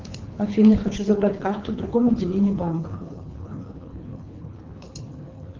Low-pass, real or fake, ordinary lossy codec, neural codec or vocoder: 7.2 kHz; fake; Opus, 24 kbps; codec, 24 kHz, 3 kbps, HILCodec